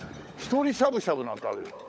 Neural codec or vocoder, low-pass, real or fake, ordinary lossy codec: codec, 16 kHz, 16 kbps, FunCodec, trained on LibriTTS, 50 frames a second; none; fake; none